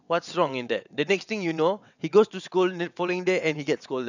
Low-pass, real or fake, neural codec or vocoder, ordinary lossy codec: 7.2 kHz; fake; vocoder, 22.05 kHz, 80 mel bands, WaveNeXt; none